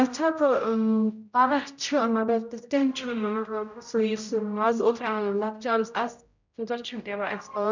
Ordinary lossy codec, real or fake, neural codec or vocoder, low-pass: none; fake; codec, 16 kHz, 0.5 kbps, X-Codec, HuBERT features, trained on general audio; 7.2 kHz